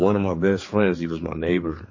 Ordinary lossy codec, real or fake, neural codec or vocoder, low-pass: MP3, 32 kbps; fake; codec, 16 kHz, 4 kbps, X-Codec, HuBERT features, trained on general audio; 7.2 kHz